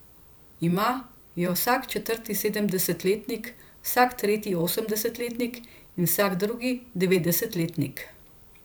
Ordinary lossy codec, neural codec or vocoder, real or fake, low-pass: none; vocoder, 44.1 kHz, 128 mel bands every 512 samples, BigVGAN v2; fake; none